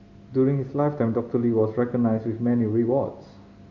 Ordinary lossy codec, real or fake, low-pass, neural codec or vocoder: Opus, 64 kbps; real; 7.2 kHz; none